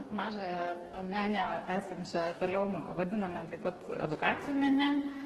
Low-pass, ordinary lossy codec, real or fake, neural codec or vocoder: 14.4 kHz; Opus, 16 kbps; fake; codec, 44.1 kHz, 2.6 kbps, DAC